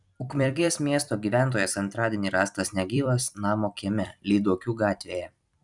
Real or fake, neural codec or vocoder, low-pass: fake; vocoder, 44.1 kHz, 128 mel bands every 256 samples, BigVGAN v2; 10.8 kHz